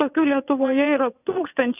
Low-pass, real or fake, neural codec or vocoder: 3.6 kHz; fake; vocoder, 22.05 kHz, 80 mel bands, WaveNeXt